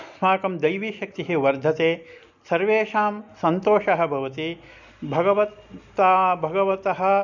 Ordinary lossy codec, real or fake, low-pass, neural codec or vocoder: none; real; 7.2 kHz; none